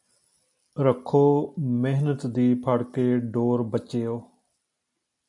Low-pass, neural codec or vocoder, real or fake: 10.8 kHz; none; real